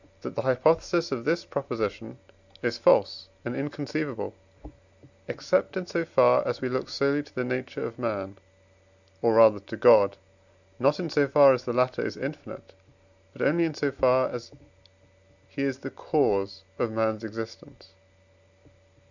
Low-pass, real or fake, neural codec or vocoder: 7.2 kHz; real; none